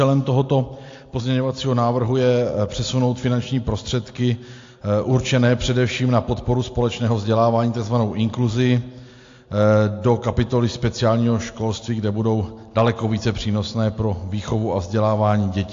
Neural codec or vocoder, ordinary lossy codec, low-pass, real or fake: none; AAC, 48 kbps; 7.2 kHz; real